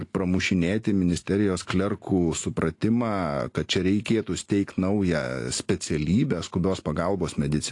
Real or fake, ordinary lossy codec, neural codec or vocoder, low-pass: real; AAC, 48 kbps; none; 10.8 kHz